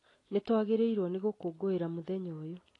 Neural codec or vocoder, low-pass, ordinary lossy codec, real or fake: none; 10.8 kHz; AAC, 32 kbps; real